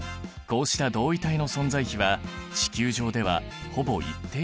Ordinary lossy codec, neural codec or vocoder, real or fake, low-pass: none; none; real; none